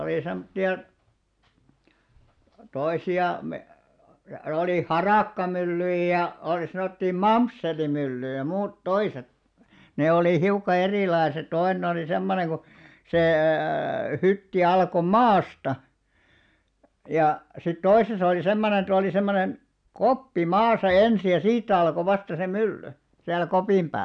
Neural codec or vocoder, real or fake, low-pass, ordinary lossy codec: none; real; 9.9 kHz; none